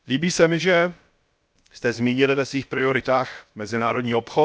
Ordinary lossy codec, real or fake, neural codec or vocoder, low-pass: none; fake; codec, 16 kHz, about 1 kbps, DyCAST, with the encoder's durations; none